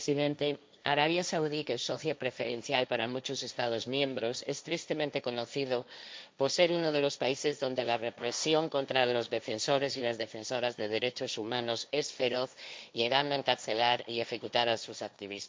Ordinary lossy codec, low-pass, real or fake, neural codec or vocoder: none; none; fake; codec, 16 kHz, 1.1 kbps, Voila-Tokenizer